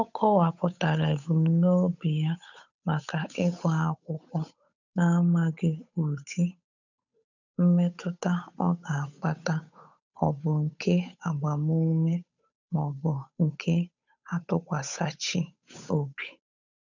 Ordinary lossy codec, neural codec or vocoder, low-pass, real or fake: none; codec, 16 kHz, 8 kbps, FunCodec, trained on Chinese and English, 25 frames a second; 7.2 kHz; fake